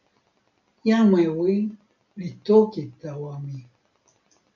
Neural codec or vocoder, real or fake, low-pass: none; real; 7.2 kHz